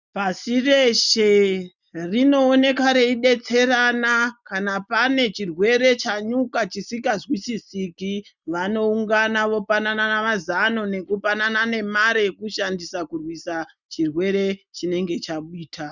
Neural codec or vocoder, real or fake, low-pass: none; real; 7.2 kHz